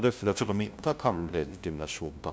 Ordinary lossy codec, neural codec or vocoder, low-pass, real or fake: none; codec, 16 kHz, 0.5 kbps, FunCodec, trained on LibriTTS, 25 frames a second; none; fake